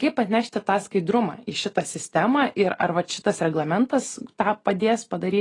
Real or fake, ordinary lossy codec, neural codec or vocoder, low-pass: real; AAC, 32 kbps; none; 10.8 kHz